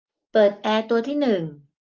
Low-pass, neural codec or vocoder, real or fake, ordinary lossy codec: 7.2 kHz; none; real; Opus, 24 kbps